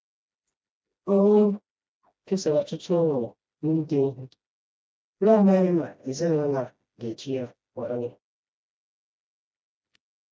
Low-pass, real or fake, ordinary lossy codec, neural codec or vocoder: none; fake; none; codec, 16 kHz, 1 kbps, FreqCodec, smaller model